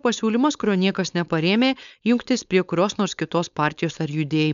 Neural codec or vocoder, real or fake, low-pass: codec, 16 kHz, 4.8 kbps, FACodec; fake; 7.2 kHz